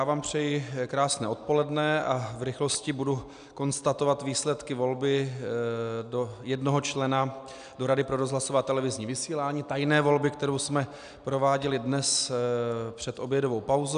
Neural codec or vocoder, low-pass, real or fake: none; 9.9 kHz; real